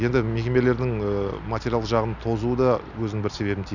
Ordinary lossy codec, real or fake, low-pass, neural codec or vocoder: none; real; 7.2 kHz; none